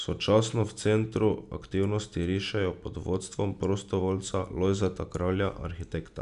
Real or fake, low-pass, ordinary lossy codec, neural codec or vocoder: real; 10.8 kHz; none; none